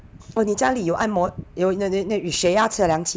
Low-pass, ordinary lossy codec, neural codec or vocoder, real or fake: none; none; none; real